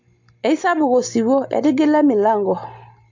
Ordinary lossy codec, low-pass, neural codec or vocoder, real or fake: MP3, 48 kbps; 7.2 kHz; none; real